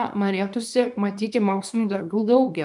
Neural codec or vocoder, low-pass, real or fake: codec, 24 kHz, 0.9 kbps, WavTokenizer, small release; 10.8 kHz; fake